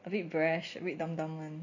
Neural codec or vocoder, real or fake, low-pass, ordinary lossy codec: none; real; 7.2 kHz; none